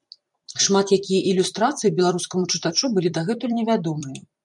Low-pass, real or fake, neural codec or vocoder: 10.8 kHz; fake; vocoder, 44.1 kHz, 128 mel bands every 256 samples, BigVGAN v2